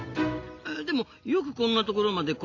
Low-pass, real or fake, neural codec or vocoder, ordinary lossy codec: 7.2 kHz; real; none; AAC, 48 kbps